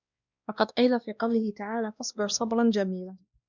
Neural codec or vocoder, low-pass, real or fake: codec, 16 kHz, 1 kbps, X-Codec, WavLM features, trained on Multilingual LibriSpeech; 7.2 kHz; fake